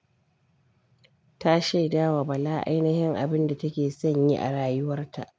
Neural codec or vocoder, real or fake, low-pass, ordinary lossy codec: none; real; none; none